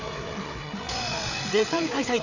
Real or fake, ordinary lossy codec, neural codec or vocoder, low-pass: fake; none; codec, 16 kHz, 8 kbps, FreqCodec, larger model; 7.2 kHz